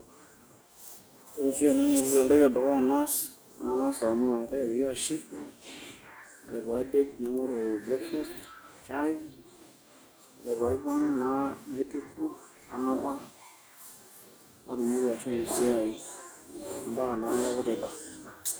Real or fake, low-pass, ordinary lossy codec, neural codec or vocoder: fake; none; none; codec, 44.1 kHz, 2.6 kbps, DAC